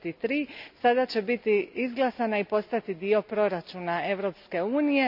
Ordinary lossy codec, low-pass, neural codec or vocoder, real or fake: none; 5.4 kHz; none; real